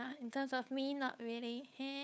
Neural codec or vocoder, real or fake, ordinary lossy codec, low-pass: codec, 16 kHz, 8 kbps, FunCodec, trained on Chinese and English, 25 frames a second; fake; none; none